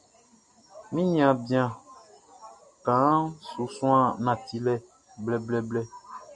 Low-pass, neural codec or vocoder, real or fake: 10.8 kHz; none; real